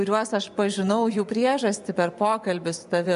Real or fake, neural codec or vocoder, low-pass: fake; vocoder, 24 kHz, 100 mel bands, Vocos; 10.8 kHz